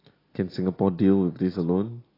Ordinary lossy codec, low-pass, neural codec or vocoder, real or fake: AAC, 24 kbps; 5.4 kHz; none; real